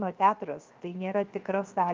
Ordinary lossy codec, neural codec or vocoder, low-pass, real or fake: Opus, 24 kbps; codec, 16 kHz, 0.7 kbps, FocalCodec; 7.2 kHz; fake